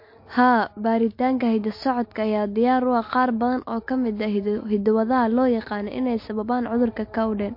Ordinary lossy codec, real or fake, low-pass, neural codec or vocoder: MP3, 32 kbps; real; 5.4 kHz; none